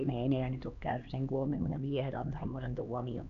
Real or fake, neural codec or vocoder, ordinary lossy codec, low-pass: fake; codec, 16 kHz, 2 kbps, X-Codec, HuBERT features, trained on LibriSpeech; none; 7.2 kHz